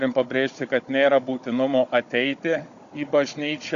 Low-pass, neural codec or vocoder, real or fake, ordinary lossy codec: 7.2 kHz; codec, 16 kHz, 16 kbps, FunCodec, trained on Chinese and English, 50 frames a second; fake; Opus, 64 kbps